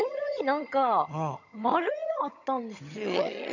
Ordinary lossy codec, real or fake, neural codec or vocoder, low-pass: none; fake; vocoder, 22.05 kHz, 80 mel bands, HiFi-GAN; 7.2 kHz